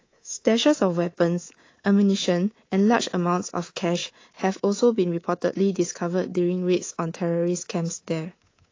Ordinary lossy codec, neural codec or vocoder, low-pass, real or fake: AAC, 32 kbps; codec, 24 kHz, 3.1 kbps, DualCodec; 7.2 kHz; fake